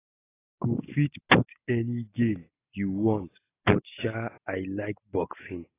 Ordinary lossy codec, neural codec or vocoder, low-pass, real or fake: AAC, 16 kbps; none; 3.6 kHz; real